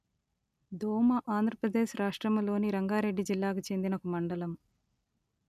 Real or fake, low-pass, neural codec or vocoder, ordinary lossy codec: real; 14.4 kHz; none; none